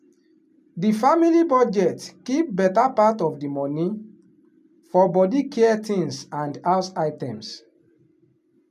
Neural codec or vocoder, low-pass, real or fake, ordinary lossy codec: none; 14.4 kHz; real; none